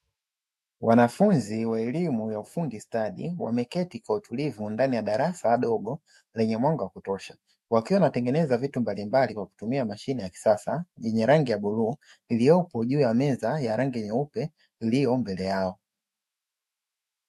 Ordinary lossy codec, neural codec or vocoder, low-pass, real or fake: MP3, 64 kbps; autoencoder, 48 kHz, 128 numbers a frame, DAC-VAE, trained on Japanese speech; 14.4 kHz; fake